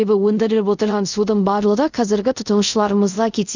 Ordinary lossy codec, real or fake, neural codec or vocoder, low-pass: none; fake; codec, 24 kHz, 0.5 kbps, DualCodec; 7.2 kHz